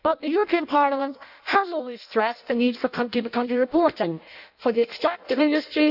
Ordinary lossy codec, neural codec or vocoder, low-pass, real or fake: none; codec, 16 kHz in and 24 kHz out, 0.6 kbps, FireRedTTS-2 codec; 5.4 kHz; fake